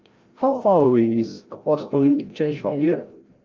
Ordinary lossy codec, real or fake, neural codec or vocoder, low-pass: Opus, 32 kbps; fake; codec, 16 kHz, 0.5 kbps, FreqCodec, larger model; 7.2 kHz